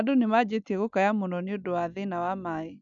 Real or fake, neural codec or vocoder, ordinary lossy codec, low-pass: real; none; none; 7.2 kHz